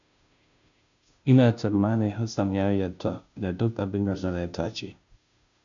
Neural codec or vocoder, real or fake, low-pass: codec, 16 kHz, 0.5 kbps, FunCodec, trained on Chinese and English, 25 frames a second; fake; 7.2 kHz